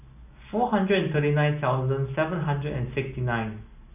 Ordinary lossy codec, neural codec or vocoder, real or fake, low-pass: none; none; real; 3.6 kHz